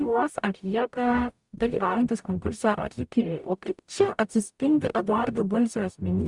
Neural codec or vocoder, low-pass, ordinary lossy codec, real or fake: codec, 44.1 kHz, 0.9 kbps, DAC; 10.8 kHz; Opus, 64 kbps; fake